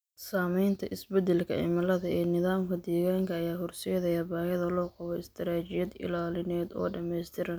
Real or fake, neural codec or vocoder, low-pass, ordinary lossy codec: real; none; none; none